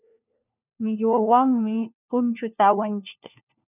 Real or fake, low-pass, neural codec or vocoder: fake; 3.6 kHz; codec, 16 kHz, 1 kbps, FunCodec, trained on LibriTTS, 50 frames a second